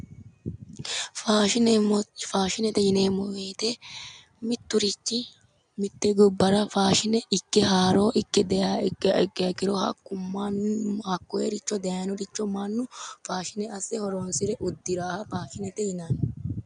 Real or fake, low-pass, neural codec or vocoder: real; 9.9 kHz; none